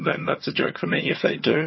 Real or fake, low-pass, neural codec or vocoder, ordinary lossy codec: fake; 7.2 kHz; vocoder, 22.05 kHz, 80 mel bands, HiFi-GAN; MP3, 24 kbps